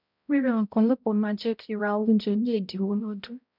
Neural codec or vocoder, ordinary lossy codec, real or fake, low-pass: codec, 16 kHz, 0.5 kbps, X-Codec, HuBERT features, trained on balanced general audio; none; fake; 5.4 kHz